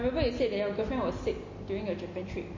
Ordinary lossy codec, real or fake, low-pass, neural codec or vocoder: MP3, 32 kbps; real; 7.2 kHz; none